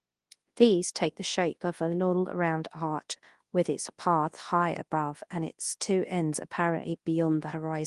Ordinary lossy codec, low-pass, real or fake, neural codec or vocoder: Opus, 24 kbps; 10.8 kHz; fake; codec, 24 kHz, 0.9 kbps, WavTokenizer, large speech release